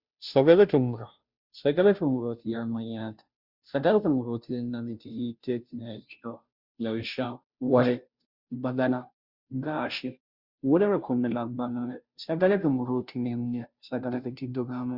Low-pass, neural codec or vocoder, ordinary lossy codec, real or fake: 5.4 kHz; codec, 16 kHz, 0.5 kbps, FunCodec, trained on Chinese and English, 25 frames a second; Opus, 64 kbps; fake